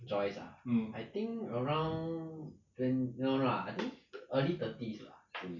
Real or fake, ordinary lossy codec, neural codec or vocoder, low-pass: real; none; none; 7.2 kHz